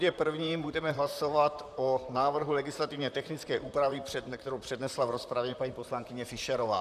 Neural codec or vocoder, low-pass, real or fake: vocoder, 44.1 kHz, 128 mel bands, Pupu-Vocoder; 14.4 kHz; fake